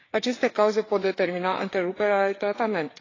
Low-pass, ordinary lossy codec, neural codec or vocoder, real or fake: 7.2 kHz; AAC, 32 kbps; codec, 16 kHz, 4 kbps, FreqCodec, larger model; fake